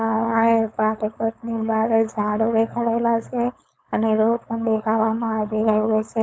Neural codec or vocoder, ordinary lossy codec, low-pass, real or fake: codec, 16 kHz, 4.8 kbps, FACodec; none; none; fake